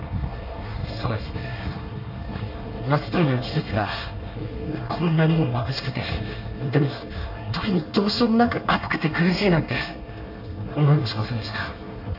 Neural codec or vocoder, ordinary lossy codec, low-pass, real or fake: codec, 24 kHz, 1 kbps, SNAC; none; 5.4 kHz; fake